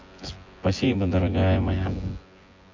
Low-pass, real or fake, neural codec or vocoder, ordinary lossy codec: 7.2 kHz; fake; vocoder, 24 kHz, 100 mel bands, Vocos; MP3, 48 kbps